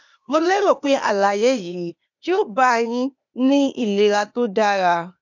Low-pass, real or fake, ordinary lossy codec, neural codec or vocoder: 7.2 kHz; fake; none; codec, 16 kHz, 0.8 kbps, ZipCodec